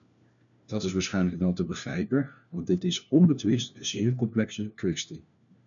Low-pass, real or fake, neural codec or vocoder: 7.2 kHz; fake; codec, 16 kHz, 1 kbps, FunCodec, trained on LibriTTS, 50 frames a second